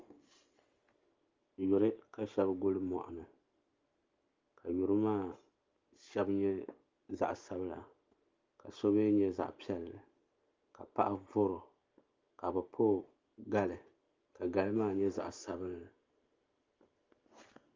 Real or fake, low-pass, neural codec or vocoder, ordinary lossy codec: real; 7.2 kHz; none; Opus, 32 kbps